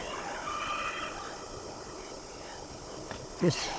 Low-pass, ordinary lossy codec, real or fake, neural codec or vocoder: none; none; fake; codec, 16 kHz, 16 kbps, FunCodec, trained on Chinese and English, 50 frames a second